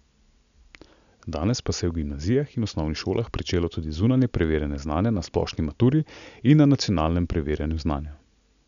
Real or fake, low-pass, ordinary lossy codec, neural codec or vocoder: real; 7.2 kHz; none; none